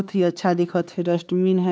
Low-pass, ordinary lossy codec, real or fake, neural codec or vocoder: none; none; fake; codec, 16 kHz, 4 kbps, X-Codec, HuBERT features, trained on LibriSpeech